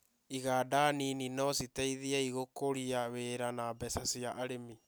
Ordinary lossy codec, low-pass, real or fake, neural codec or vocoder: none; none; real; none